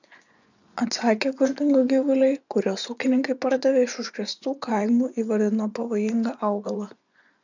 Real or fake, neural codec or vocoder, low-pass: fake; vocoder, 24 kHz, 100 mel bands, Vocos; 7.2 kHz